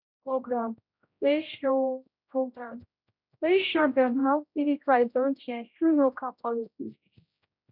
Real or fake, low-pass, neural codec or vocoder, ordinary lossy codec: fake; 5.4 kHz; codec, 16 kHz, 0.5 kbps, X-Codec, HuBERT features, trained on general audio; none